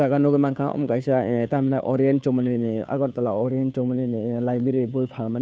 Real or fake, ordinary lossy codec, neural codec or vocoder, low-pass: fake; none; codec, 16 kHz, 2 kbps, FunCodec, trained on Chinese and English, 25 frames a second; none